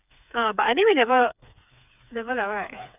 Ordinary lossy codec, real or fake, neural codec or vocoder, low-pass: none; fake; codec, 16 kHz, 4 kbps, FreqCodec, smaller model; 3.6 kHz